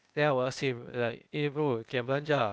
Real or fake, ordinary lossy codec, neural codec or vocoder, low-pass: fake; none; codec, 16 kHz, 0.8 kbps, ZipCodec; none